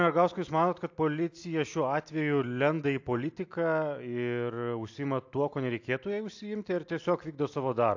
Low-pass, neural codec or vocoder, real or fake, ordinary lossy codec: 7.2 kHz; none; real; AAC, 48 kbps